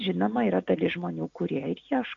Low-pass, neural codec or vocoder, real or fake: 7.2 kHz; none; real